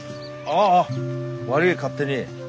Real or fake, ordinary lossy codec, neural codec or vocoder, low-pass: real; none; none; none